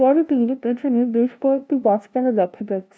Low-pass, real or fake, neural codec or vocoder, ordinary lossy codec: none; fake; codec, 16 kHz, 0.5 kbps, FunCodec, trained on LibriTTS, 25 frames a second; none